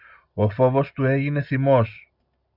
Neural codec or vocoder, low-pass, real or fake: none; 5.4 kHz; real